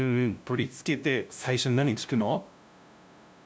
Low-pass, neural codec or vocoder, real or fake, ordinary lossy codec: none; codec, 16 kHz, 0.5 kbps, FunCodec, trained on LibriTTS, 25 frames a second; fake; none